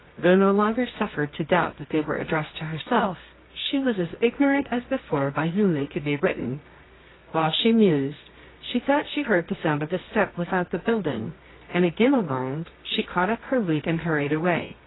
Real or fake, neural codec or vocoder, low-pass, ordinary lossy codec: fake; codec, 24 kHz, 0.9 kbps, WavTokenizer, medium music audio release; 7.2 kHz; AAC, 16 kbps